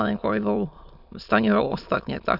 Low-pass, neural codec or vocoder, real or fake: 5.4 kHz; autoencoder, 22.05 kHz, a latent of 192 numbers a frame, VITS, trained on many speakers; fake